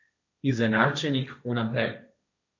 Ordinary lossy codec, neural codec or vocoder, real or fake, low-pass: none; codec, 16 kHz, 1.1 kbps, Voila-Tokenizer; fake; 7.2 kHz